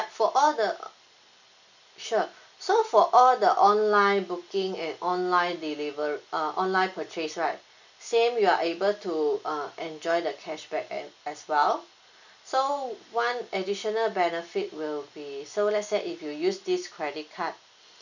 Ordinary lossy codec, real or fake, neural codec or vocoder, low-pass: none; real; none; 7.2 kHz